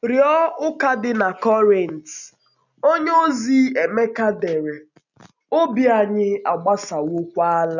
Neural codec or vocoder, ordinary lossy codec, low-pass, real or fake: none; none; 7.2 kHz; real